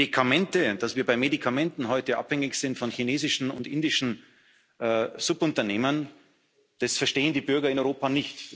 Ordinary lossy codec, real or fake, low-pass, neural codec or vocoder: none; real; none; none